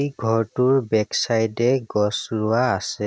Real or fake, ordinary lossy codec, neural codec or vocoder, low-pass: real; none; none; none